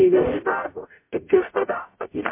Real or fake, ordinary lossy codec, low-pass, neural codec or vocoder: fake; MP3, 24 kbps; 3.6 kHz; codec, 44.1 kHz, 0.9 kbps, DAC